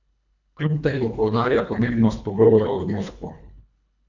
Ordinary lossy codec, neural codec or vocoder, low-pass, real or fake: none; codec, 24 kHz, 1.5 kbps, HILCodec; 7.2 kHz; fake